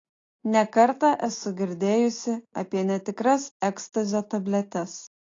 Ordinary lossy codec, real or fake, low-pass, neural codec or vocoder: AAC, 32 kbps; real; 7.2 kHz; none